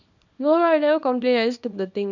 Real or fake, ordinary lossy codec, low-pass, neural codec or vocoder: fake; none; 7.2 kHz; codec, 24 kHz, 0.9 kbps, WavTokenizer, small release